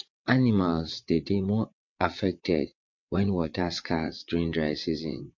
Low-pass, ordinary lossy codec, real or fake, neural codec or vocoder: 7.2 kHz; MP3, 48 kbps; fake; vocoder, 22.05 kHz, 80 mel bands, WaveNeXt